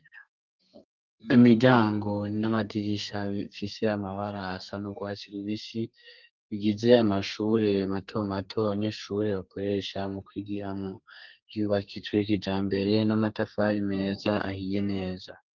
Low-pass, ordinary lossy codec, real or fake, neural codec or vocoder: 7.2 kHz; Opus, 24 kbps; fake; codec, 32 kHz, 1.9 kbps, SNAC